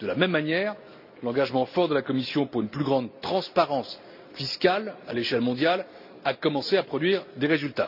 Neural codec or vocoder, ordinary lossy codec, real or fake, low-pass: none; AAC, 48 kbps; real; 5.4 kHz